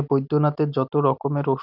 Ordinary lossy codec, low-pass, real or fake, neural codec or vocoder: none; 5.4 kHz; real; none